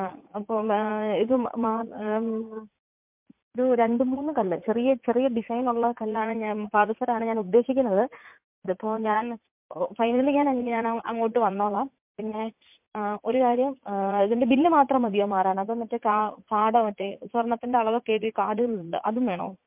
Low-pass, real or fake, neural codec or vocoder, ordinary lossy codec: 3.6 kHz; fake; vocoder, 44.1 kHz, 80 mel bands, Vocos; MP3, 32 kbps